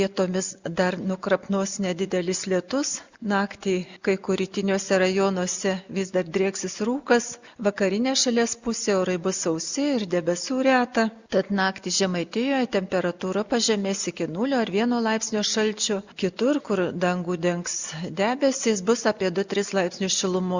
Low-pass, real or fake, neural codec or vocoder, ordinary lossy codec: 7.2 kHz; real; none; Opus, 64 kbps